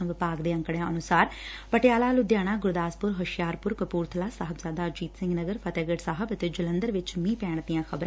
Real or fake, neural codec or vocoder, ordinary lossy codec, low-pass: real; none; none; none